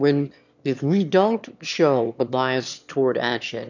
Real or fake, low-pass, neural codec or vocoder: fake; 7.2 kHz; autoencoder, 22.05 kHz, a latent of 192 numbers a frame, VITS, trained on one speaker